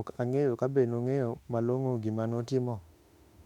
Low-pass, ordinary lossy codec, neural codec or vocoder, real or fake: 19.8 kHz; MP3, 96 kbps; autoencoder, 48 kHz, 32 numbers a frame, DAC-VAE, trained on Japanese speech; fake